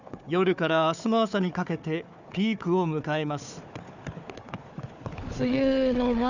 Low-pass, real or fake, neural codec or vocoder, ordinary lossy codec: 7.2 kHz; fake; codec, 16 kHz, 4 kbps, FunCodec, trained on Chinese and English, 50 frames a second; none